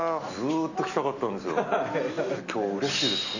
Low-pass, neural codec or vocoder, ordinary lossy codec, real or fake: 7.2 kHz; none; none; real